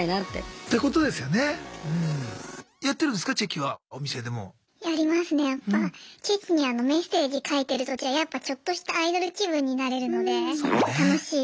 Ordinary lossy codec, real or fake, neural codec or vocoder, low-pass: none; real; none; none